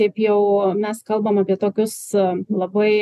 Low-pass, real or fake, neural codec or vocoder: 14.4 kHz; real; none